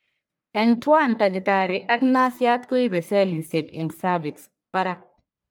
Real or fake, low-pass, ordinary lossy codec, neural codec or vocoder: fake; none; none; codec, 44.1 kHz, 1.7 kbps, Pupu-Codec